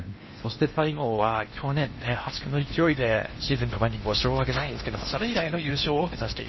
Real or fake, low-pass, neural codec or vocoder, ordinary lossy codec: fake; 7.2 kHz; codec, 16 kHz in and 24 kHz out, 0.8 kbps, FocalCodec, streaming, 65536 codes; MP3, 24 kbps